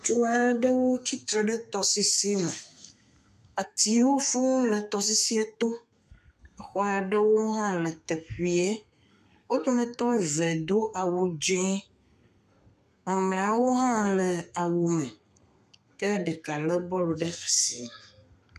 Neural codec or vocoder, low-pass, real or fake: codec, 32 kHz, 1.9 kbps, SNAC; 14.4 kHz; fake